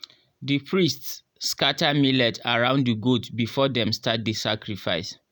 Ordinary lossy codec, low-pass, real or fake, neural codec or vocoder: none; none; real; none